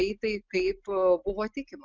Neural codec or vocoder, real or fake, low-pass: none; real; 7.2 kHz